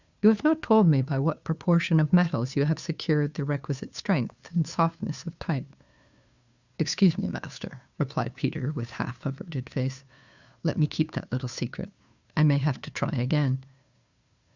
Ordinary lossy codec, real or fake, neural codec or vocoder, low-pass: Opus, 64 kbps; fake; codec, 16 kHz, 2 kbps, FunCodec, trained on Chinese and English, 25 frames a second; 7.2 kHz